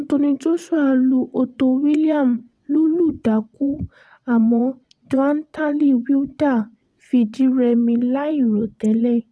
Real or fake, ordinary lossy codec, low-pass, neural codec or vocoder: fake; none; none; vocoder, 22.05 kHz, 80 mel bands, WaveNeXt